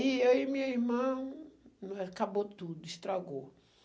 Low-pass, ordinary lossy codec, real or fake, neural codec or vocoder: none; none; real; none